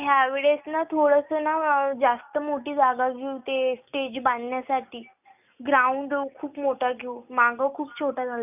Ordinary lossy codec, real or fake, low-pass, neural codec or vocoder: none; real; 3.6 kHz; none